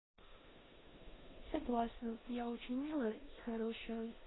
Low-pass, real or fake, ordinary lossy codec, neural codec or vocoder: 7.2 kHz; fake; AAC, 16 kbps; codec, 16 kHz in and 24 kHz out, 0.9 kbps, LongCat-Audio-Codec, four codebook decoder